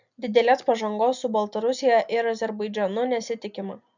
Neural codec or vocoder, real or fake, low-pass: none; real; 7.2 kHz